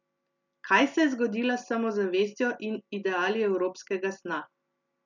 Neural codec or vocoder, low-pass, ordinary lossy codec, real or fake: none; 7.2 kHz; none; real